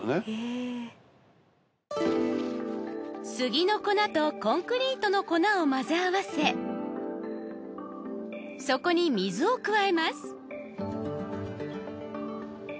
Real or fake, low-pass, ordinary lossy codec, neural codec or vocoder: real; none; none; none